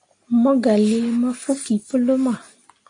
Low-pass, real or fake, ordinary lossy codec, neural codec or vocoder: 9.9 kHz; real; AAC, 48 kbps; none